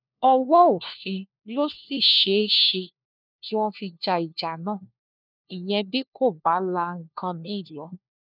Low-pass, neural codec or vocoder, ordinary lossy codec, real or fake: 5.4 kHz; codec, 16 kHz, 1 kbps, FunCodec, trained on LibriTTS, 50 frames a second; none; fake